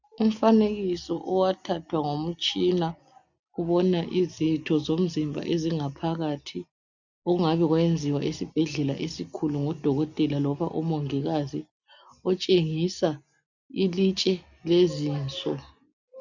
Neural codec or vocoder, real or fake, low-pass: none; real; 7.2 kHz